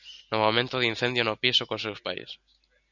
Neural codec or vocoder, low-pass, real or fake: none; 7.2 kHz; real